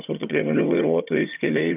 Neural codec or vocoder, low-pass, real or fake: vocoder, 22.05 kHz, 80 mel bands, HiFi-GAN; 3.6 kHz; fake